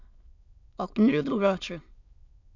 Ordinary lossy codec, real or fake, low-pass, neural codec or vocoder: none; fake; 7.2 kHz; autoencoder, 22.05 kHz, a latent of 192 numbers a frame, VITS, trained on many speakers